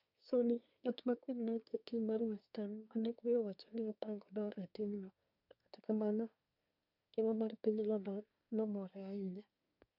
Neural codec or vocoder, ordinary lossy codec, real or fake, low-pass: codec, 24 kHz, 1 kbps, SNAC; none; fake; 5.4 kHz